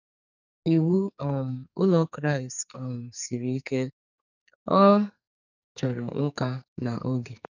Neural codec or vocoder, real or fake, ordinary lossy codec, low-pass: codec, 44.1 kHz, 2.6 kbps, SNAC; fake; none; 7.2 kHz